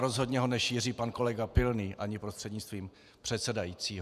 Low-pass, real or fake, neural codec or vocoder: 14.4 kHz; fake; vocoder, 48 kHz, 128 mel bands, Vocos